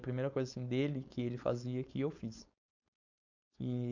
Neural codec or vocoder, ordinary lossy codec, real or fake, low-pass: codec, 16 kHz, 4.8 kbps, FACodec; none; fake; 7.2 kHz